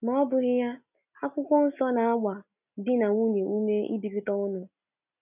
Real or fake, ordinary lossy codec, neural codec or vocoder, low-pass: real; none; none; 3.6 kHz